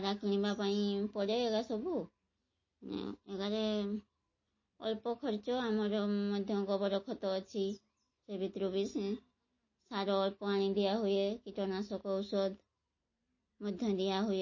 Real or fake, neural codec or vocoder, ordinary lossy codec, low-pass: real; none; MP3, 32 kbps; 7.2 kHz